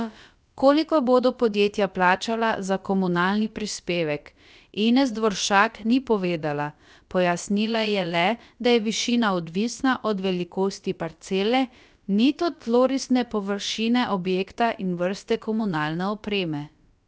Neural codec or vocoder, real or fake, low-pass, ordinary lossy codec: codec, 16 kHz, about 1 kbps, DyCAST, with the encoder's durations; fake; none; none